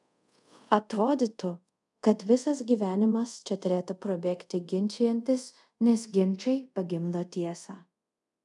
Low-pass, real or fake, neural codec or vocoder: 10.8 kHz; fake; codec, 24 kHz, 0.5 kbps, DualCodec